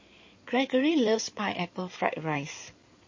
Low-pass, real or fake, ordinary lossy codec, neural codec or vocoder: 7.2 kHz; fake; MP3, 32 kbps; codec, 16 kHz in and 24 kHz out, 2.2 kbps, FireRedTTS-2 codec